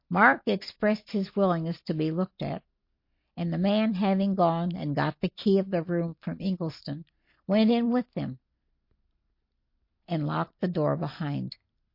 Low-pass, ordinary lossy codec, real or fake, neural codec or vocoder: 5.4 kHz; MP3, 32 kbps; real; none